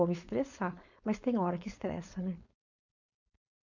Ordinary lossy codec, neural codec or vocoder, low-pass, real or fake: none; codec, 16 kHz, 4.8 kbps, FACodec; 7.2 kHz; fake